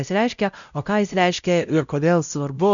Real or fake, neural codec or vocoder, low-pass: fake; codec, 16 kHz, 0.5 kbps, X-Codec, WavLM features, trained on Multilingual LibriSpeech; 7.2 kHz